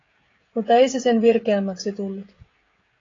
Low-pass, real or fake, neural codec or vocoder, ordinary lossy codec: 7.2 kHz; fake; codec, 16 kHz, 16 kbps, FreqCodec, smaller model; AAC, 32 kbps